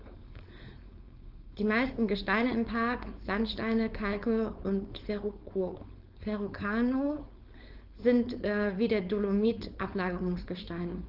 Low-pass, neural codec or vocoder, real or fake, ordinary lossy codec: 5.4 kHz; codec, 16 kHz, 4.8 kbps, FACodec; fake; Opus, 32 kbps